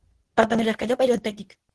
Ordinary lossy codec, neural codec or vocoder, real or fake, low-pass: Opus, 16 kbps; codec, 24 kHz, 0.9 kbps, WavTokenizer, medium speech release version 2; fake; 10.8 kHz